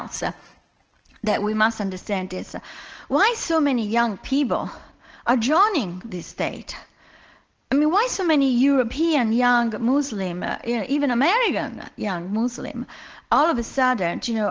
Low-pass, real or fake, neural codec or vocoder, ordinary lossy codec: 7.2 kHz; real; none; Opus, 16 kbps